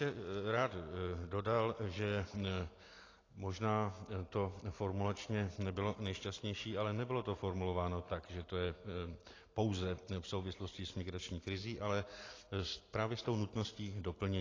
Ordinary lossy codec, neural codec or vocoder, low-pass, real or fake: AAC, 32 kbps; none; 7.2 kHz; real